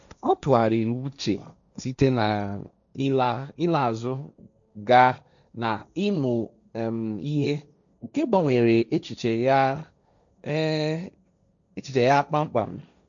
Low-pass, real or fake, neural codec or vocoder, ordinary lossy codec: 7.2 kHz; fake; codec, 16 kHz, 1.1 kbps, Voila-Tokenizer; none